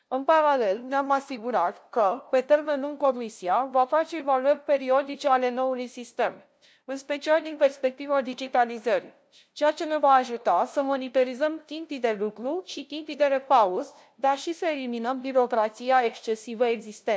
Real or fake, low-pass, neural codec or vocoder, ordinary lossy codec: fake; none; codec, 16 kHz, 0.5 kbps, FunCodec, trained on LibriTTS, 25 frames a second; none